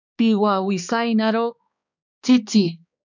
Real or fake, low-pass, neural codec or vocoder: fake; 7.2 kHz; codec, 16 kHz, 2 kbps, X-Codec, HuBERT features, trained on balanced general audio